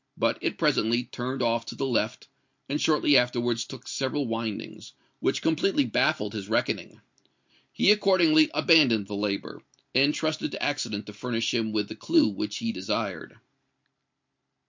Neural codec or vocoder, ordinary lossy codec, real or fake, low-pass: none; MP3, 48 kbps; real; 7.2 kHz